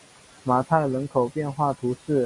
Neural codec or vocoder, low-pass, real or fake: none; 10.8 kHz; real